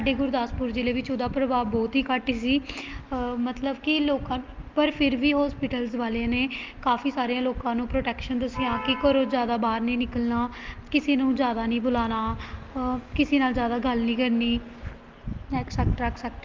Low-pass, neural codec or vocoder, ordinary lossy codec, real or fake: 7.2 kHz; none; Opus, 24 kbps; real